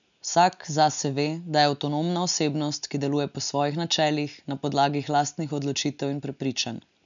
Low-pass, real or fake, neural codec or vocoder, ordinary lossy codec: 7.2 kHz; real; none; none